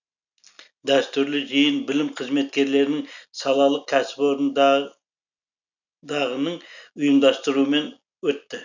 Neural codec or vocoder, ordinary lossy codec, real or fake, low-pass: none; none; real; 7.2 kHz